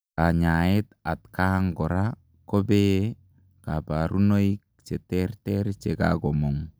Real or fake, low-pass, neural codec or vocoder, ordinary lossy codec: real; none; none; none